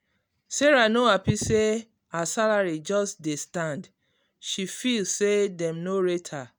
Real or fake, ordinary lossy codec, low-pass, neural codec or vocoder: real; none; none; none